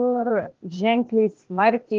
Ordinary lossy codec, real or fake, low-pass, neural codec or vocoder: Opus, 16 kbps; fake; 7.2 kHz; codec, 16 kHz, 0.8 kbps, ZipCodec